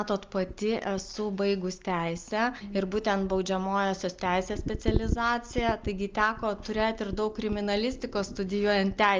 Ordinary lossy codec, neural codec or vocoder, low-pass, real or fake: Opus, 32 kbps; none; 7.2 kHz; real